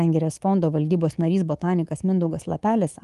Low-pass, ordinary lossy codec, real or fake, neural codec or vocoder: 10.8 kHz; Opus, 24 kbps; fake; codec, 24 kHz, 3.1 kbps, DualCodec